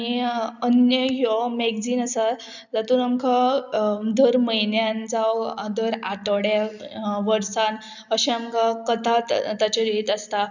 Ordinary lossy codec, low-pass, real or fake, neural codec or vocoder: none; 7.2 kHz; real; none